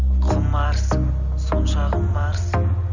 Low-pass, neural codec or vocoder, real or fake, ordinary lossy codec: 7.2 kHz; none; real; none